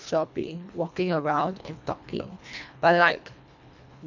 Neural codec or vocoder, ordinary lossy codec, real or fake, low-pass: codec, 24 kHz, 1.5 kbps, HILCodec; none; fake; 7.2 kHz